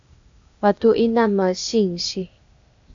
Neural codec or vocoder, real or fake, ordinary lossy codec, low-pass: codec, 16 kHz, 0.8 kbps, ZipCodec; fake; AAC, 64 kbps; 7.2 kHz